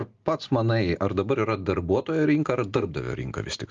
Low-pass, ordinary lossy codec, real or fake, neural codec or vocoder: 7.2 kHz; Opus, 32 kbps; real; none